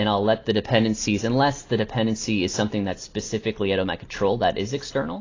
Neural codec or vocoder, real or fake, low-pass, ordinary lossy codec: none; real; 7.2 kHz; AAC, 32 kbps